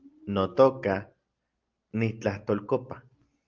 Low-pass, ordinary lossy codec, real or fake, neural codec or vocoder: 7.2 kHz; Opus, 32 kbps; real; none